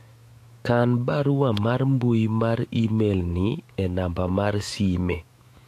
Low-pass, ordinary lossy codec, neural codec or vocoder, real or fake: 14.4 kHz; AAC, 64 kbps; autoencoder, 48 kHz, 128 numbers a frame, DAC-VAE, trained on Japanese speech; fake